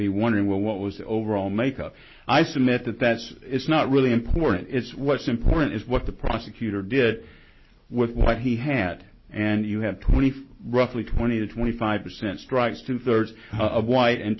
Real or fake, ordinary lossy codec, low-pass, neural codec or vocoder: real; MP3, 24 kbps; 7.2 kHz; none